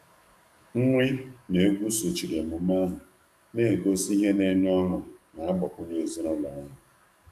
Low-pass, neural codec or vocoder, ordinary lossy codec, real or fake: 14.4 kHz; codec, 44.1 kHz, 7.8 kbps, DAC; none; fake